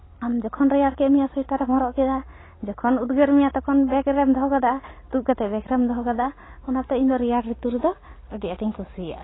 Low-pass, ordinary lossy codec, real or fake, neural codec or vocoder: 7.2 kHz; AAC, 16 kbps; real; none